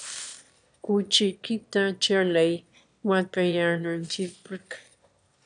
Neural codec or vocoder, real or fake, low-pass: autoencoder, 22.05 kHz, a latent of 192 numbers a frame, VITS, trained on one speaker; fake; 9.9 kHz